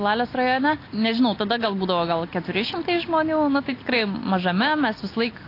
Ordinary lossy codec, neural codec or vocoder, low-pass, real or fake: AAC, 32 kbps; none; 5.4 kHz; real